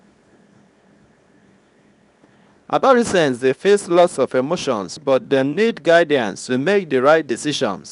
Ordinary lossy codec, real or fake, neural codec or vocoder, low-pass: none; fake; codec, 24 kHz, 0.9 kbps, WavTokenizer, small release; 10.8 kHz